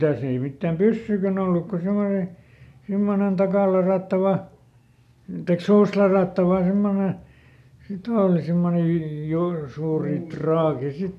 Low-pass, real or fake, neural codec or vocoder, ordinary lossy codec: 14.4 kHz; real; none; none